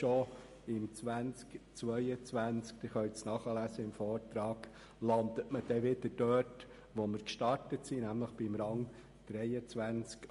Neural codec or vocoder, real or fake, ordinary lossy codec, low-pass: none; real; MP3, 48 kbps; 14.4 kHz